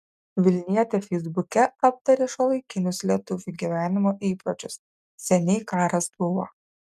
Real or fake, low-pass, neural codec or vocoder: real; 14.4 kHz; none